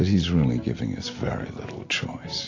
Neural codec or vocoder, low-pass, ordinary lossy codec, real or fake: none; 7.2 kHz; AAC, 32 kbps; real